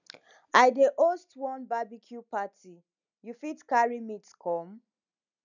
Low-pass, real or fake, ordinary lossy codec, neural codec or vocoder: 7.2 kHz; real; none; none